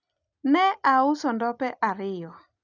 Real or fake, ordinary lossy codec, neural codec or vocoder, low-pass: real; none; none; 7.2 kHz